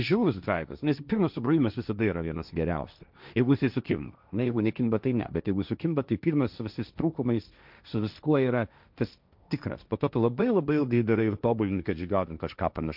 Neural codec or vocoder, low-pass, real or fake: codec, 16 kHz, 1.1 kbps, Voila-Tokenizer; 5.4 kHz; fake